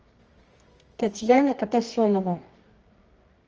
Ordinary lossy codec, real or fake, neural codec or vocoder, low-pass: Opus, 24 kbps; fake; codec, 24 kHz, 0.9 kbps, WavTokenizer, medium music audio release; 7.2 kHz